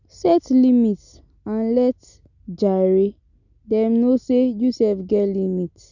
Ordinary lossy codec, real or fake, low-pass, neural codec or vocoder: none; real; 7.2 kHz; none